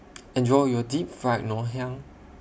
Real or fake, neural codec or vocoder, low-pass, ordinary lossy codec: real; none; none; none